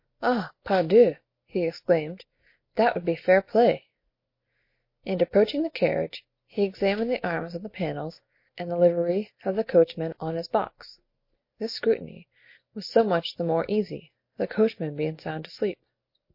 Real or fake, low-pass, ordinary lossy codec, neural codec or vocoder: real; 5.4 kHz; MP3, 32 kbps; none